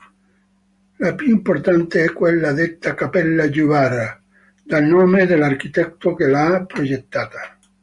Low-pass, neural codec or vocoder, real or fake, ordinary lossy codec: 10.8 kHz; none; real; Opus, 64 kbps